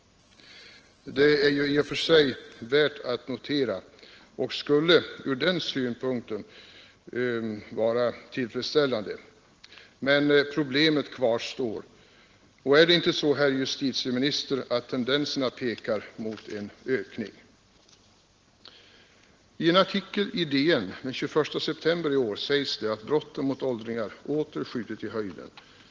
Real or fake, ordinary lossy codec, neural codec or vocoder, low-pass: real; Opus, 16 kbps; none; 7.2 kHz